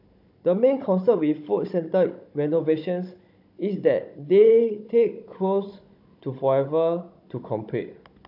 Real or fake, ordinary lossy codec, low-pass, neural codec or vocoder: fake; AAC, 48 kbps; 5.4 kHz; codec, 16 kHz, 16 kbps, FunCodec, trained on Chinese and English, 50 frames a second